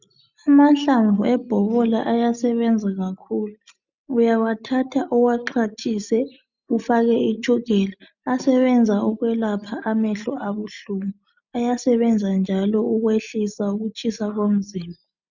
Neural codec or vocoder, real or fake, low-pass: none; real; 7.2 kHz